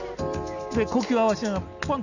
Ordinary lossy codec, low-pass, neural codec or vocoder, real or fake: none; 7.2 kHz; none; real